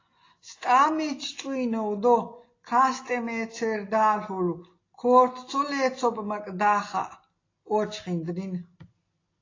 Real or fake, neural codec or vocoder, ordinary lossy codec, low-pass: real; none; AAC, 32 kbps; 7.2 kHz